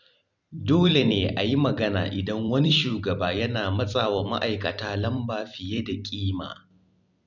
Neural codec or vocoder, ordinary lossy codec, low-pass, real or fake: vocoder, 44.1 kHz, 128 mel bands every 256 samples, BigVGAN v2; none; 7.2 kHz; fake